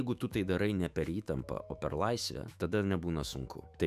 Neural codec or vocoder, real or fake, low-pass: autoencoder, 48 kHz, 128 numbers a frame, DAC-VAE, trained on Japanese speech; fake; 14.4 kHz